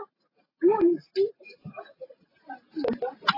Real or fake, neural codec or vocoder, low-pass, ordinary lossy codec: real; none; 5.4 kHz; AAC, 24 kbps